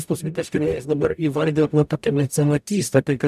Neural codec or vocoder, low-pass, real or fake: codec, 44.1 kHz, 0.9 kbps, DAC; 14.4 kHz; fake